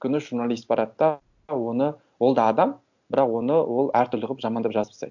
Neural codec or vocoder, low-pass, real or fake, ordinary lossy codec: none; 7.2 kHz; real; none